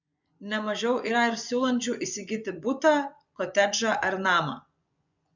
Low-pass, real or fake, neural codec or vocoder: 7.2 kHz; fake; vocoder, 24 kHz, 100 mel bands, Vocos